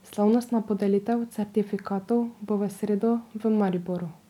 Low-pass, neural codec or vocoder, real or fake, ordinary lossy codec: 19.8 kHz; none; real; none